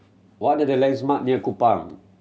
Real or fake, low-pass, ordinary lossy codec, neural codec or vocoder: real; none; none; none